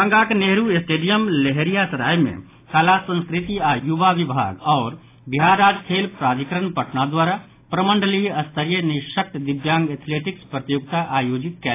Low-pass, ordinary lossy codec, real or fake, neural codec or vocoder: 3.6 kHz; AAC, 24 kbps; real; none